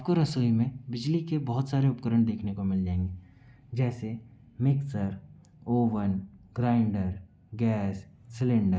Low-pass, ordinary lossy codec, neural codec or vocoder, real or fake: none; none; none; real